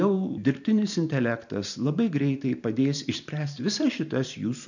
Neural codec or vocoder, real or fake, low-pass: vocoder, 44.1 kHz, 128 mel bands every 512 samples, BigVGAN v2; fake; 7.2 kHz